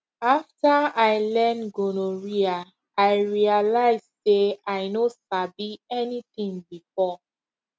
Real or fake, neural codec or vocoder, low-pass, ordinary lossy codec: real; none; none; none